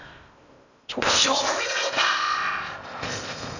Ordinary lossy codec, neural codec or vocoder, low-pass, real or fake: none; codec, 16 kHz in and 24 kHz out, 0.6 kbps, FocalCodec, streaming, 4096 codes; 7.2 kHz; fake